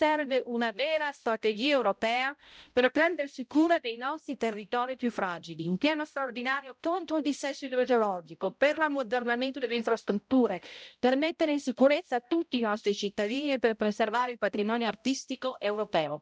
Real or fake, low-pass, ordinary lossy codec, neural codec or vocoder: fake; none; none; codec, 16 kHz, 0.5 kbps, X-Codec, HuBERT features, trained on balanced general audio